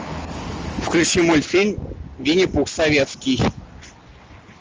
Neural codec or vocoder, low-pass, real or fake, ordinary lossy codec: none; 7.2 kHz; real; Opus, 24 kbps